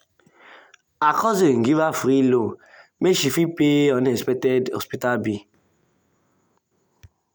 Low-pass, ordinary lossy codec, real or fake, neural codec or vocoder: none; none; real; none